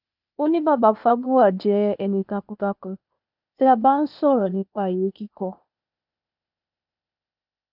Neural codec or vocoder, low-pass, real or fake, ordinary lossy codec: codec, 16 kHz, 0.8 kbps, ZipCodec; 5.4 kHz; fake; none